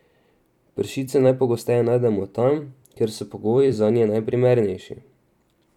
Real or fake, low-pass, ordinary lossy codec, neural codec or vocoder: fake; 19.8 kHz; none; vocoder, 44.1 kHz, 128 mel bands every 256 samples, BigVGAN v2